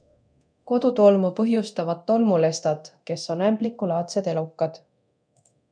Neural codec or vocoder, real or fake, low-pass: codec, 24 kHz, 0.9 kbps, DualCodec; fake; 9.9 kHz